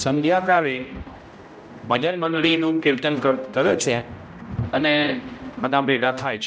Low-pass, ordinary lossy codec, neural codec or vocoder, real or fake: none; none; codec, 16 kHz, 0.5 kbps, X-Codec, HuBERT features, trained on general audio; fake